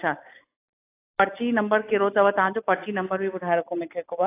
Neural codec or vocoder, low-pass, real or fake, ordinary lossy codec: none; 3.6 kHz; real; AAC, 24 kbps